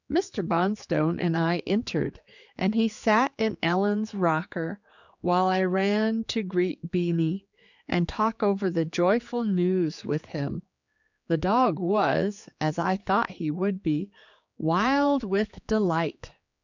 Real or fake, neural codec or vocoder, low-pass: fake; codec, 16 kHz, 4 kbps, X-Codec, HuBERT features, trained on general audio; 7.2 kHz